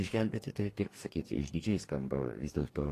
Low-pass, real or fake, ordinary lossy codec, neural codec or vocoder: 14.4 kHz; fake; AAC, 48 kbps; codec, 44.1 kHz, 2.6 kbps, DAC